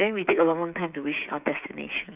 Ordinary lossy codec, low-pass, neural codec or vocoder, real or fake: none; 3.6 kHz; codec, 16 kHz, 8 kbps, FreqCodec, smaller model; fake